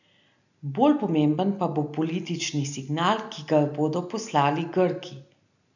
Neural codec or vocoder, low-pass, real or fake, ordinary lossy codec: none; 7.2 kHz; real; none